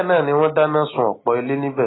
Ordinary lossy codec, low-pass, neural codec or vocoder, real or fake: AAC, 16 kbps; 7.2 kHz; none; real